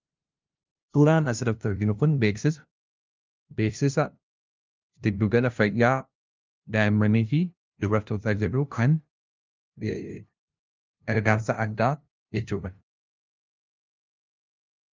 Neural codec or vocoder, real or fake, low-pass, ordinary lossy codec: codec, 16 kHz, 0.5 kbps, FunCodec, trained on LibriTTS, 25 frames a second; fake; 7.2 kHz; Opus, 24 kbps